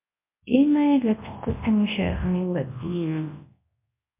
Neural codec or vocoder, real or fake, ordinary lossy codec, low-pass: codec, 24 kHz, 0.9 kbps, WavTokenizer, large speech release; fake; MP3, 24 kbps; 3.6 kHz